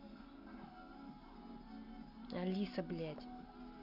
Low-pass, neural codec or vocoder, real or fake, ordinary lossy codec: 5.4 kHz; none; real; none